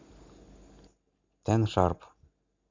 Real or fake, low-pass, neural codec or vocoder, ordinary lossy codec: real; 7.2 kHz; none; MP3, 64 kbps